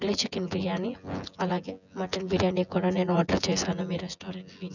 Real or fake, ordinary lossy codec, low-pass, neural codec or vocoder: fake; none; 7.2 kHz; vocoder, 24 kHz, 100 mel bands, Vocos